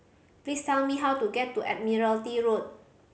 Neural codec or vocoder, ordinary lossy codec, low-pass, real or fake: none; none; none; real